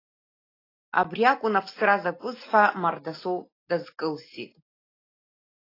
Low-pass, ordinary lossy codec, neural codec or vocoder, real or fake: 5.4 kHz; AAC, 24 kbps; none; real